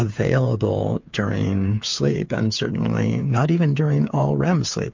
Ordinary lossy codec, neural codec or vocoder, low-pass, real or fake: MP3, 48 kbps; codec, 16 kHz, 8 kbps, FreqCodec, smaller model; 7.2 kHz; fake